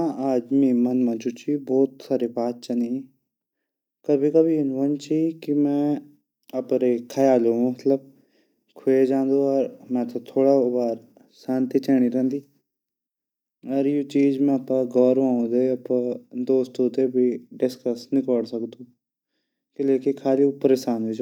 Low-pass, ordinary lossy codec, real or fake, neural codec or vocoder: 19.8 kHz; none; real; none